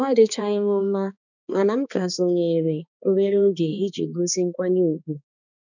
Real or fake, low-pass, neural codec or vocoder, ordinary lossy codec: fake; 7.2 kHz; codec, 16 kHz, 2 kbps, X-Codec, HuBERT features, trained on balanced general audio; none